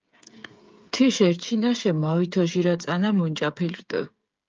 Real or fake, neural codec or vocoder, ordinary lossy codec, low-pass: fake; codec, 16 kHz, 8 kbps, FreqCodec, smaller model; Opus, 24 kbps; 7.2 kHz